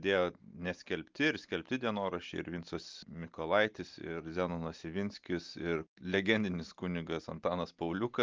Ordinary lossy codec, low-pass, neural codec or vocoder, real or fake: Opus, 24 kbps; 7.2 kHz; none; real